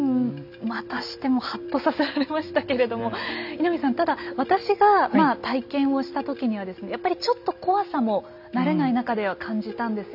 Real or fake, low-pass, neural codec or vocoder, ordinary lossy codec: real; 5.4 kHz; none; none